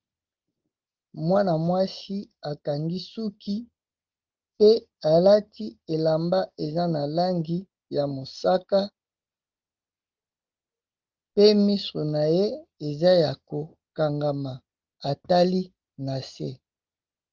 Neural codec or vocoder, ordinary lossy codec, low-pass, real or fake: none; Opus, 32 kbps; 7.2 kHz; real